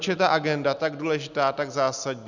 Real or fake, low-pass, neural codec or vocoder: real; 7.2 kHz; none